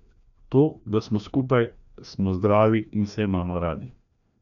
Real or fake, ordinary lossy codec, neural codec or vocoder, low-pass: fake; none; codec, 16 kHz, 1 kbps, FreqCodec, larger model; 7.2 kHz